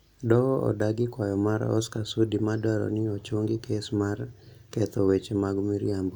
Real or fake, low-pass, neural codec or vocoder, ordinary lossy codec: real; 19.8 kHz; none; none